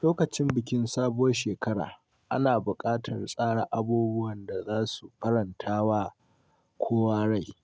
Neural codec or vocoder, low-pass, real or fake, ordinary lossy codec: none; none; real; none